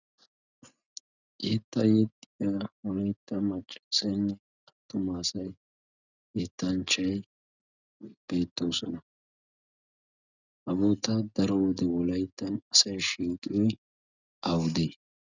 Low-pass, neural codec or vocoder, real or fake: 7.2 kHz; none; real